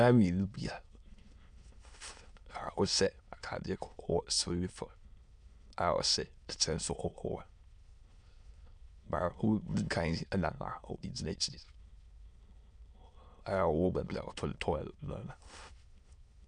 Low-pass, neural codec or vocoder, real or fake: 9.9 kHz; autoencoder, 22.05 kHz, a latent of 192 numbers a frame, VITS, trained on many speakers; fake